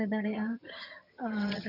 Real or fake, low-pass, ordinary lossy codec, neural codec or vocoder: fake; 5.4 kHz; none; vocoder, 22.05 kHz, 80 mel bands, HiFi-GAN